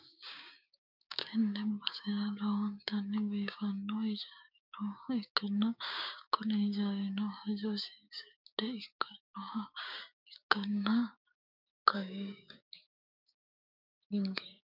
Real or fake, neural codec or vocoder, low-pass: fake; codec, 16 kHz, 6 kbps, DAC; 5.4 kHz